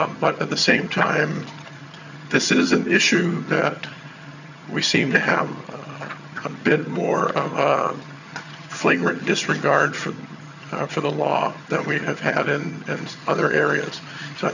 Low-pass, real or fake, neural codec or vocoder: 7.2 kHz; fake; vocoder, 22.05 kHz, 80 mel bands, HiFi-GAN